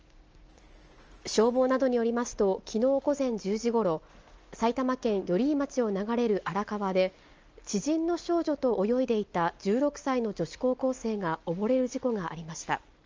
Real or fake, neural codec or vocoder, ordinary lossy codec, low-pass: real; none; Opus, 24 kbps; 7.2 kHz